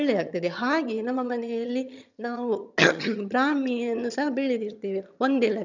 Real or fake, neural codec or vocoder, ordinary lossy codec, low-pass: fake; vocoder, 22.05 kHz, 80 mel bands, HiFi-GAN; none; 7.2 kHz